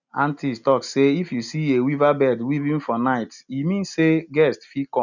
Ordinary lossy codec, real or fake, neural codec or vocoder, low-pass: none; real; none; 7.2 kHz